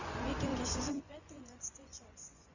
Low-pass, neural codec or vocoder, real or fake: 7.2 kHz; vocoder, 24 kHz, 100 mel bands, Vocos; fake